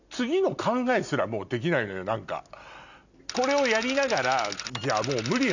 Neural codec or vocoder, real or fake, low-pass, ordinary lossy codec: none; real; 7.2 kHz; none